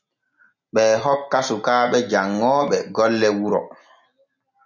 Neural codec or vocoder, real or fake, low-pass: none; real; 7.2 kHz